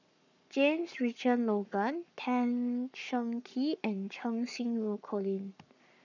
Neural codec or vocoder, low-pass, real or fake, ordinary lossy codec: codec, 44.1 kHz, 7.8 kbps, Pupu-Codec; 7.2 kHz; fake; none